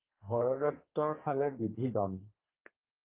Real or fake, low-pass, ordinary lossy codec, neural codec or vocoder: fake; 3.6 kHz; Opus, 32 kbps; codec, 32 kHz, 1.9 kbps, SNAC